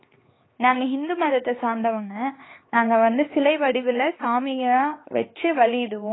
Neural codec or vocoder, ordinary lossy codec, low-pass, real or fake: codec, 16 kHz, 4 kbps, X-Codec, HuBERT features, trained on LibriSpeech; AAC, 16 kbps; 7.2 kHz; fake